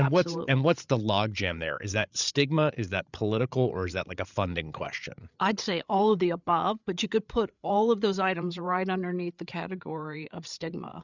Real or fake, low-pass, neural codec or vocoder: fake; 7.2 kHz; codec, 16 kHz, 8 kbps, FreqCodec, larger model